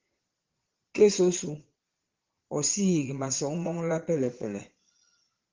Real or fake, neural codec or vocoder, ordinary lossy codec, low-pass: fake; vocoder, 22.05 kHz, 80 mel bands, Vocos; Opus, 16 kbps; 7.2 kHz